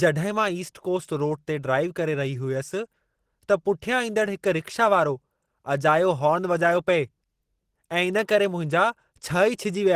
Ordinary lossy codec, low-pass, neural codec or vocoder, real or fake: Opus, 16 kbps; 14.4 kHz; none; real